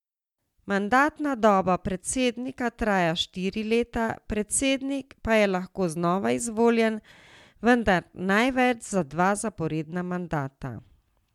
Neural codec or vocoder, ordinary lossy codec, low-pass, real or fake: none; MP3, 96 kbps; 19.8 kHz; real